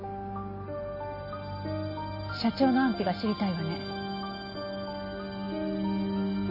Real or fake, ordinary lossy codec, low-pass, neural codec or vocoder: real; MP3, 24 kbps; 5.4 kHz; none